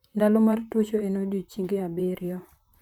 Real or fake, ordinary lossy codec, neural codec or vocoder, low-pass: fake; none; vocoder, 44.1 kHz, 128 mel bands, Pupu-Vocoder; 19.8 kHz